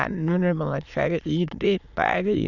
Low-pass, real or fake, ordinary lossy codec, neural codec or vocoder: 7.2 kHz; fake; none; autoencoder, 22.05 kHz, a latent of 192 numbers a frame, VITS, trained on many speakers